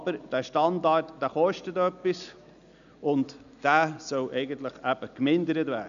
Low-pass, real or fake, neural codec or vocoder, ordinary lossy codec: 7.2 kHz; real; none; none